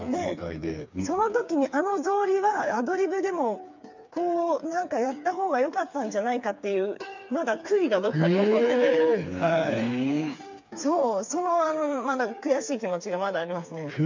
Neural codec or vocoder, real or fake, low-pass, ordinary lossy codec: codec, 16 kHz, 4 kbps, FreqCodec, smaller model; fake; 7.2 kHz; MP3, 64 kbps